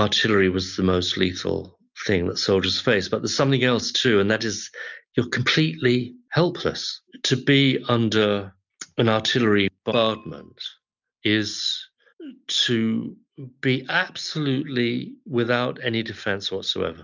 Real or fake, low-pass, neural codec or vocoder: real; 7.2 kHz; none